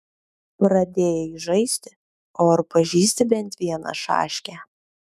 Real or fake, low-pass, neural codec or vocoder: fake; 14.4 kHz; autoencoder, 48 kHz, 128 numbers a frame, DAC-VAE, trained on Japanese speech